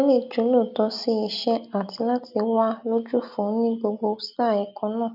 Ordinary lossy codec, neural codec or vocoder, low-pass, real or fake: none; none; 5.4 kHz; real